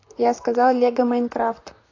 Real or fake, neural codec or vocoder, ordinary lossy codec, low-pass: fake; vocoder, 44.1 kHz, 128 mel bands, Pupu-Vocoder; AAC, 32 kbps; 7.2 kHz